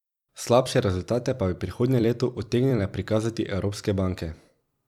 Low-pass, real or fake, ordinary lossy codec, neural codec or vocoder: 19.8 kHz; fake; none; vocoder, 44.1 kHz, 128 mel bands every 256 samples, BigVGAN v2